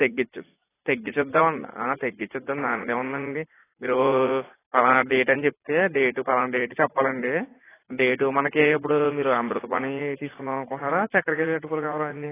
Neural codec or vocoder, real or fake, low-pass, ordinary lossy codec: vocoder, 44.1 kHz, 80 mel bands, Vocos; fake; 3.6 kHz; AAC, 16 kbps